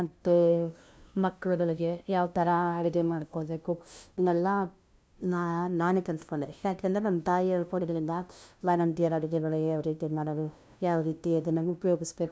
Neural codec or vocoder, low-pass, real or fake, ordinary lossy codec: codec, 16 kHz, 0.5 kbps, FunCodec, trained on LibriTTS, 25 frames a second; none; fake; none